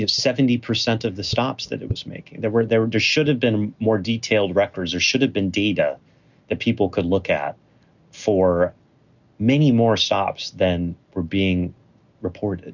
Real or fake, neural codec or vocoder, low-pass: real; none; 7.2 kHz